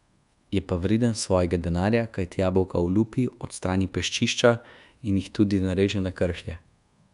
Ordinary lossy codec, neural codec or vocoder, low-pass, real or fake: none; codec, 24 kHz, 1.2 kbps, DualCodec; 10.8 kHz; fake